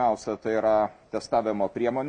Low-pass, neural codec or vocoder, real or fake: 9.9 kHz; none; real